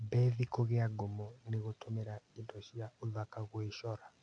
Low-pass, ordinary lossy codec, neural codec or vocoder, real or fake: 9.9 kHz; AAC, 64 kbps; none; real